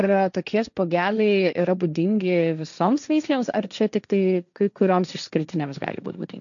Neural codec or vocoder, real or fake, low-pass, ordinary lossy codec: codec, 16 kHz, 1.1 kbps, Voila-Tokenizer; fake; 7.2 kHz; MP3, 96 kbps